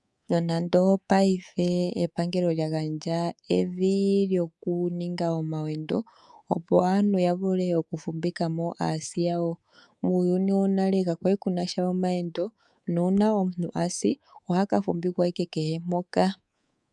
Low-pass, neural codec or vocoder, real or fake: 10.8 kHz; codec, 24 kHz, 3.1 kbps, DualCodec; fake